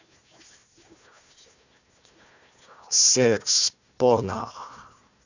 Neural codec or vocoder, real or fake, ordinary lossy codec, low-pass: codec, 16 kHz, 1 kbps, FunCodec, trained on Chinese and English, 50 frames a second; fake; none; 7.2 kHz